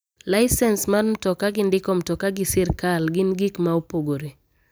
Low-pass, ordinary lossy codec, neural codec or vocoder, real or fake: none; none; none; real